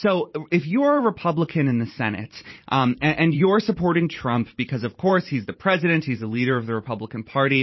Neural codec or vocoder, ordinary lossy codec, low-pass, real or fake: vocoder, 44.1 kHz, 128 mel bands every 512 samples, BigVGAN v2; MP3, 24 kbps; 7.2 kHz; fake